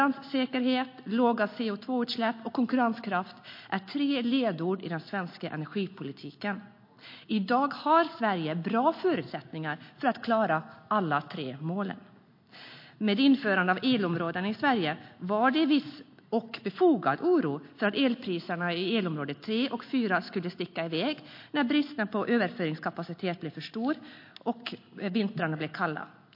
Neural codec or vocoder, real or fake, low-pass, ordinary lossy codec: none; real; 5.4 kHz; MP3, 32 kbps